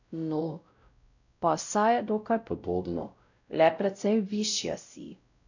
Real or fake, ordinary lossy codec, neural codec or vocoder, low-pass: fake; none; codec, 16 kHz, 0.5 kbps, X-Codec, WavLM features, trained on Multilingual LibriSpeech; 7.2 kHz